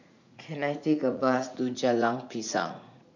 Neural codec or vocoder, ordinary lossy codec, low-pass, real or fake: vocoder, 44.1 kHz, 80 mel bands, Vocos; none; 7.2 kHz; fake